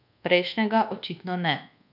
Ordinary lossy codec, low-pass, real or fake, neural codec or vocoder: none; 5.4 kHz; fake; codec, 24 kHz, 1.2 kbps, DualCodec